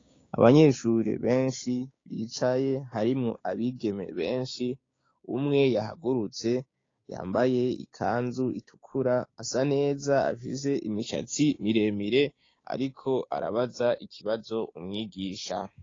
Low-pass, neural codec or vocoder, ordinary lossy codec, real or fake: 7.2 kHz; codec, 16 kHz, 6 kbps, DAC; AAC, 32 kbps; fake